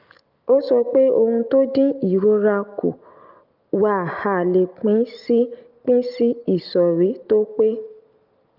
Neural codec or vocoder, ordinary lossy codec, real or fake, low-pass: none; Opus, 24 kbps; real; 5.4 kHz